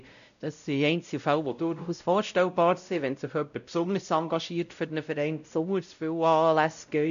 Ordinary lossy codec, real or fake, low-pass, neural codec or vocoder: none; fake; 7.2 kHz; codec, 16 kHz, 0.5 kbps, X-Codec, WavLM features, trained on Multilingual LibriSpeech